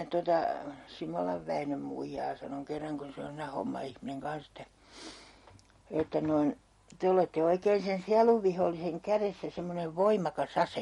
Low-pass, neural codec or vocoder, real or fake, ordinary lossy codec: 19.8 kHz; none; real; MP3, 48 kbps